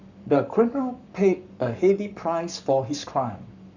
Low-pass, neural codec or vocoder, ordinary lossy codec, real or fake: 7.2 kHz; codec, 44.1 kHz, 7.8 kbps, Pupu-Codec; none; fake